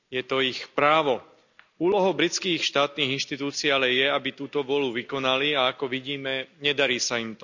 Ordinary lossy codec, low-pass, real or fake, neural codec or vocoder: none; 7.2 kHz; real; none